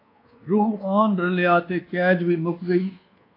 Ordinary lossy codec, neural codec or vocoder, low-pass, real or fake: AAC, 32 kbps; codec, 24 kHz, 1.2 kbps, DualCodec; 5.4 kHz; fake